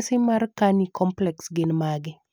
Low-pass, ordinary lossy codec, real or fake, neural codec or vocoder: none; none; real; none